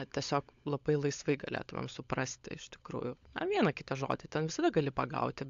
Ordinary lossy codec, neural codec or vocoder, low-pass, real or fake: AAC, 64 kbps; codec, 16 kHz, 16 kbps, FunCodec, trained on LibriTTS, 50 frames a second; 7.2 kHz; fake